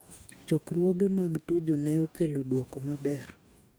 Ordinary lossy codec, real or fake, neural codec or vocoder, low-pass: none; fake; codec, 44.1 kHz, 2.6 kbps, DAC; none